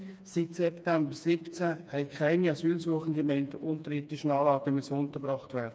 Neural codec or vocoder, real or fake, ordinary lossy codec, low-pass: codec, 16 kHz, 2 kbps, FreqCodec, smaller model; fake; none; none